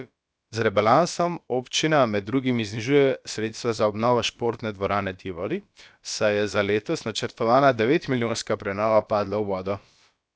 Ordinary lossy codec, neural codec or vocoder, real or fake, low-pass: none; codec, 16 kHz, about 1 kbps, DyCAST, with the encoder's durations; fake; none